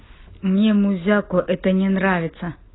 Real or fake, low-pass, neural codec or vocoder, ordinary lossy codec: real; 7.2 kHz; none; AAC, 16 kbps